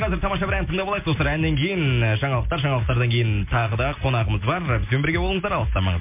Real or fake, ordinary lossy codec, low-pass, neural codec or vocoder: real; MP3, 32 kbps; 3.6 kHz; none